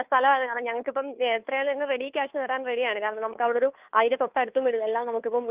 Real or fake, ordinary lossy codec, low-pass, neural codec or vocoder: fake; Opus, 24 kbps; 3.6 kHz; codec, 16 kHz, 2 kbps, FunCodec, trained on Chinese and English, 25 frames a second